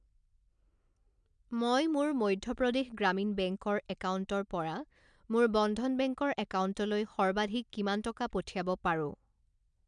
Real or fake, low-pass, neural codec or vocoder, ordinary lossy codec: real; 9.9 kHz; none; MP3, 96 kbps